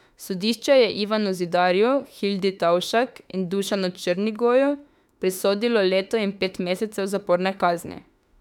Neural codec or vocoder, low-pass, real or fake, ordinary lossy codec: autoencoder, 48 kHz, 32 numbers a frame, DAC-VAE, trained on Japanese speech; 19.8 kHz; fake; none